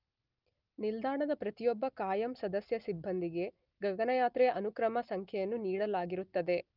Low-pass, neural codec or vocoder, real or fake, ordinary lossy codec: 5.4 kHz; none; real; Opus, 32 kbps